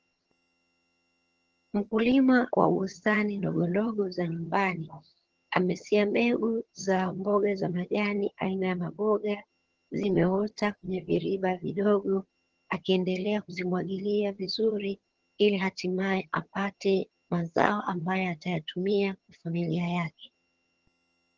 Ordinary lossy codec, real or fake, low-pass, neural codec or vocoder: Opus, 16 kbps; fake; 7.2 kHz; vocoder, 22.05 kHz, 80 mel bands, HiFi-GAN